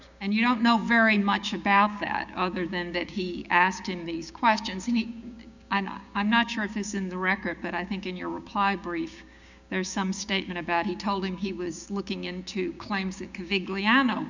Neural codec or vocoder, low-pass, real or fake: codec, 16 kHz, 6 kbps, DAC; 7.2 kHz; fake